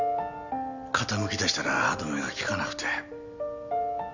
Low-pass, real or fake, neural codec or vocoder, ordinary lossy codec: 7.2 kHz; real; none; none